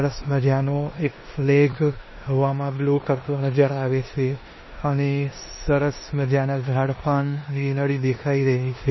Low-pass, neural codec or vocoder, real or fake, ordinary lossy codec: 7.2 kHz; codec, 16 kHz in and 24 kHz out, 0.9 kbps, LongCat-Audio-Codec, four codebook decoder; fake; MP3, 24 kbps